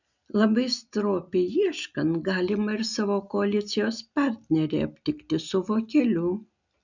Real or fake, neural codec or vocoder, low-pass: real; none; 7.2 kHz